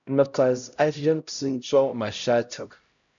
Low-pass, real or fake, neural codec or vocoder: 7.2 kHz; fake; codec, 16 kHz, 0.5 kbps, X-Codec, HuBERT features, trained on LibriSpeech